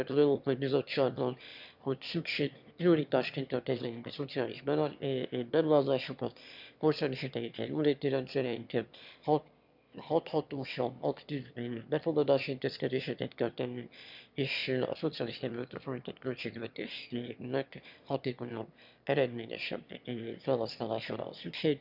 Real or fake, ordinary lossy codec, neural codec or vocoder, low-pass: fake; none; autoencoder, 22.05 kHz, a latent of 192 numbers a frame, VITS, trained on one speaker; 5.4 kHz